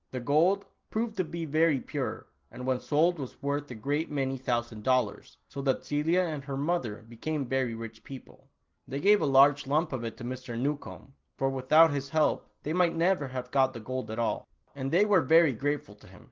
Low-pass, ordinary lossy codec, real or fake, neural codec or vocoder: 7.2 kHz; Opus, 16 kbps; real; none